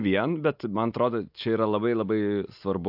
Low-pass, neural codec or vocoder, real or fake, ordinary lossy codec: 5.4 kHz; none; real; AAC, 48 kbps